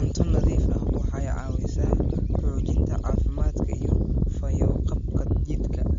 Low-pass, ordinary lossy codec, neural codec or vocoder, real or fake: 7.2 kHz; MP3, 48 kbps; none; real